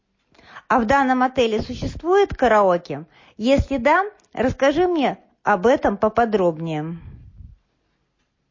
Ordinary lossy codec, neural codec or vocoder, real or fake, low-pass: MP3, 32 kbps; none; real; 7.2 kHz